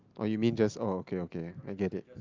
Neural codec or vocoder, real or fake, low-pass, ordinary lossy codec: none; real; 7.2 kHz; Opus, 24 kbps